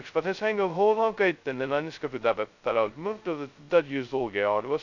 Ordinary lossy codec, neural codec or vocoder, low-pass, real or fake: none; codec, 16 kHz, 0.2 kbps, FocalCodec; 7.2 kHz; fake